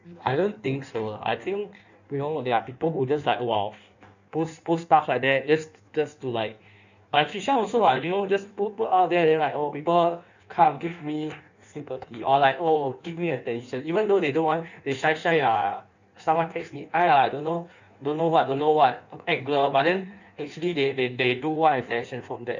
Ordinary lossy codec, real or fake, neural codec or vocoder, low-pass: none; fake; codec, 16 kHz in and 24 kHz out, 1.1 kbps, FireRedTTS-2 codec; 7.2 kHz